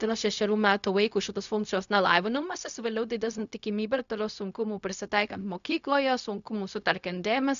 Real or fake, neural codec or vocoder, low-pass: fake; codec, 16 kHz, 0.4 kbps, LongCat-Audio-Codec; 7.2 kHz